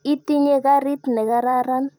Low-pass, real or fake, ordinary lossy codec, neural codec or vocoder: 19.8 kHz; fake; none; vocoder, 44.1 kHz, 128 mel bands every 512 samples, BigVGAN v2